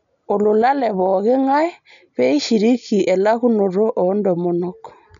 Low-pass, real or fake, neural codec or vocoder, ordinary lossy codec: 7.2 kHz; real; none; none